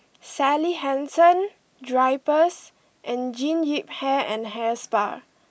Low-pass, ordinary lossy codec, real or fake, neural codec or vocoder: none; none; real; none